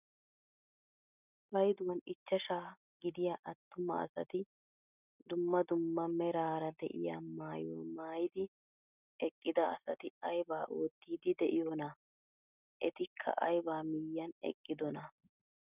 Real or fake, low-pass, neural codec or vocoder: real; 3.6 kHz; none